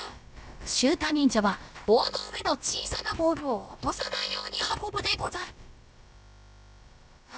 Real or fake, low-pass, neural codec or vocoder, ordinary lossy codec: fake; none; codec, 16 kHz, about 1 kbps, DyCAST, with the encoder's durations; none